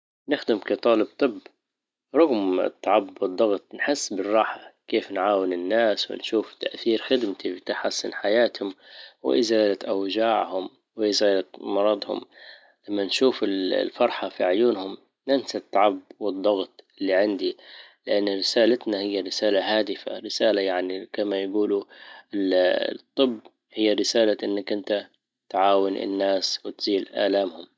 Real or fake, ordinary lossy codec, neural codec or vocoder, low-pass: real; none; none; none